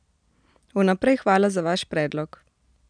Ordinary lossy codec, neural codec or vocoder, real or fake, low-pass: none; none; real; 9.9 kHz